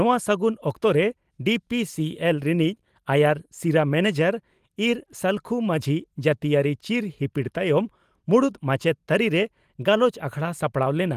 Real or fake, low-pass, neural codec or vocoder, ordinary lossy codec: fake; 14.4 kHz; vocoder, 44.1 kHz, 128 mel bands every 256 samples, BigVGAN v2; Opus, 24 kbps